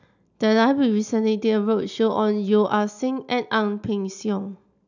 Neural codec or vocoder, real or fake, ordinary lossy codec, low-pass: none; real; none; 7.2 kHz